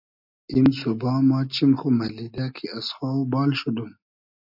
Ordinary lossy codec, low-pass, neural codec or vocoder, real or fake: AAC, 48 kbps; 5.4 kHz; none; real